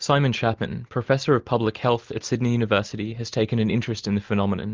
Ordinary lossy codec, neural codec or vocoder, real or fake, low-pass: Opus, 32 kbps; none; real; 7.2 kHz